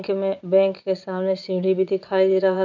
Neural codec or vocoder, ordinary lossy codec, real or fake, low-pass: vocoder, 22.05 kHz, 80 mel bands, Vocos; none; fake; 7.2 kHz